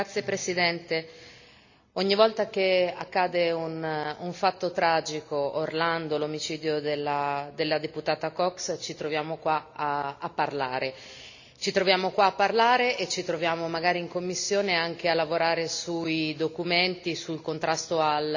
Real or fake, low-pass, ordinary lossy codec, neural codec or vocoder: real; 7.2 kHz; none; none